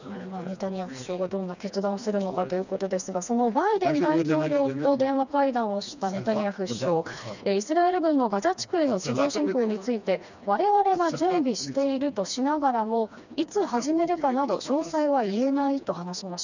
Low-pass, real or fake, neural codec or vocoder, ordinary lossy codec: 7.2 kHz; fake; codec, 16 kHz, 2 kbps, FreqCodec, smaller model; none